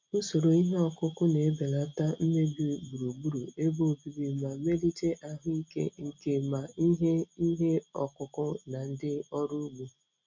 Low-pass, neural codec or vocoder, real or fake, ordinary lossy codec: 7.2 kHz; none; real; MP3, 64 kbps